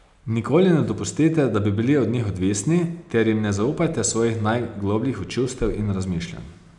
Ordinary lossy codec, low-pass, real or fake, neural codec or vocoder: none; 10.8 kHz; real; none